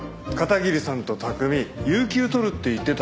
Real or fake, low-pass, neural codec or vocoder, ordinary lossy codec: real; none; none; none